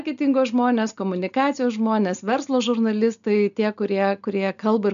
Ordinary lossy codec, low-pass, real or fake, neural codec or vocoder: AAC, 96 kbps; 7.2 kHz; real; none